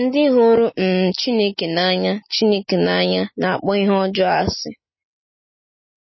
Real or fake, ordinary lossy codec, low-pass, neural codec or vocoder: real; MP3, 24 kbps; 7.2 kHz; none